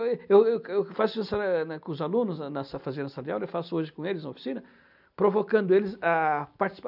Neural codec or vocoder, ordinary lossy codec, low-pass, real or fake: none; none; 5.4 kHz; real